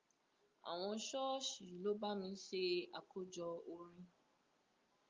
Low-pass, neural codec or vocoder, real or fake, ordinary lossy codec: 7.2 kHz; none; real; Opus, 32 kbps